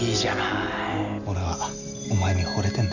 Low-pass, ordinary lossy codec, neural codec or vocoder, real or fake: 7.2 kHz; none; none; real